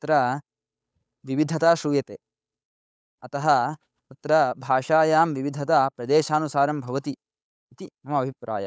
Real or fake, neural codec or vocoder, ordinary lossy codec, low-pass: fake; codec, 16 kHz, 8 kbps, FunCodec, trained on Chinese and English, 25 frames a second; none; none